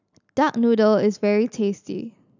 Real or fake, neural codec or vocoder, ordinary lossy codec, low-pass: real; none; none; 7.2 kHz